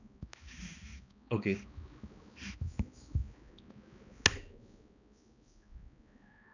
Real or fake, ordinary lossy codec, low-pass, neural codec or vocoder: fake; none; 7.2 kHz; codec, 16 kHz, 2 kbps, X-Codec, HuBERT features, trained on balanced general audio